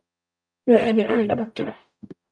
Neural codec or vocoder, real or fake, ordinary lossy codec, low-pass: codec, 44.1 kHz, 0.9 kbps, DAC; fake; MP3, 96 kbps; 9.9 kHz